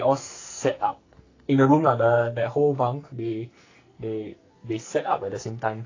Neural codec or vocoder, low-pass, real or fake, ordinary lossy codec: codec, 44.1 kHz, 2.6 kbps, SNAC; 7.2 kHz; fake; AAC, 32 kbps